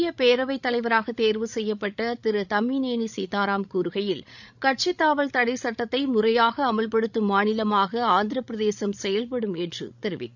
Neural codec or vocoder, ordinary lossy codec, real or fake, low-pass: codec, 16 kHz, 16 kbps, FreqCodec, larger model; none; fake; 7.2 kHz